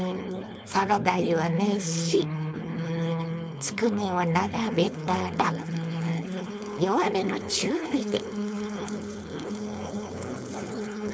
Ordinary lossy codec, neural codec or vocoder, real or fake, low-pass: none; codec, 16 kHz, 4.8 kbps, FACodec; fake; none